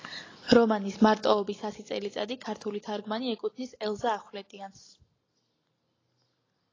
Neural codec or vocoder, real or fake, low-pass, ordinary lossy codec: none; real; 7.2 kHz; AAC, 32 kbps